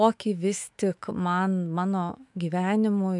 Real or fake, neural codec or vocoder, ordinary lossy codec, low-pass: fake; autoencoder, 48 kHz, 128 numbers a frame, DAC-VAE, trained on Japanese speech; MP3, 96 kbps; 10.8 kHz